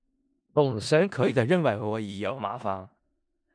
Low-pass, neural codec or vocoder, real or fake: 9.9 kHz; codec, 16 kHz in and 24 kHz out, 0.4 kbps, LongCat-Audio-Codec, four codebook decoder; fake